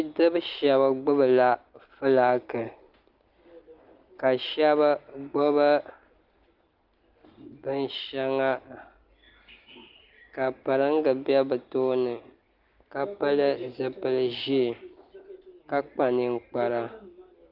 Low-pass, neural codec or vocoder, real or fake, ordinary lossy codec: 5.4 kHz; none; real; Opus, 32 kbps